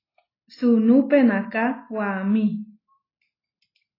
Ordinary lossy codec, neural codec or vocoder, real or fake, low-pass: AAC, 24 kbps; none; real; 5.4 kHz